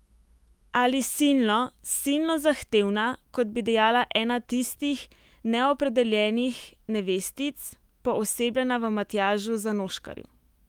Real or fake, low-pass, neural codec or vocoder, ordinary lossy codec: fake; 19.8 kHz; autoencoder, 48 kHz, 128 numbers a frame, DAC-VAE, trained on Japanese speech; Opus, 32 kbps